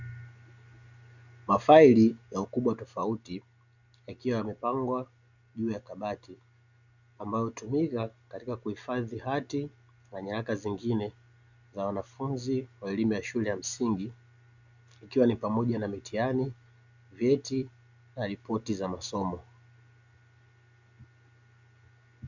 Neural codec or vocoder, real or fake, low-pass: none; real; 7.2 kHz